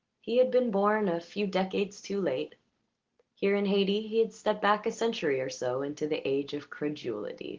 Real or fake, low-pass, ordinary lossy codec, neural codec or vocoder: real; 7.2 kHz; Opus, 16 kbps; none